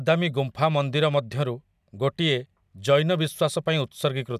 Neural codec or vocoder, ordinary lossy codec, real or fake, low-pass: none; none; real; 14.4 kHz